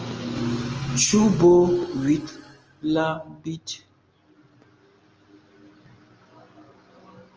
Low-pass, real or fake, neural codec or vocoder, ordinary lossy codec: 7.2 kHz; real; none; Opus, 24 kbps